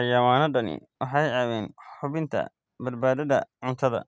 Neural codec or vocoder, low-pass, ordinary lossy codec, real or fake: none; none; none; real